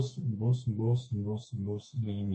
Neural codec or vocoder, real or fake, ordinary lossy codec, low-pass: codec, 32 kHz, 1.9 kbps, SNAC; fake; MP3, 32 kbps; 10.8 kHz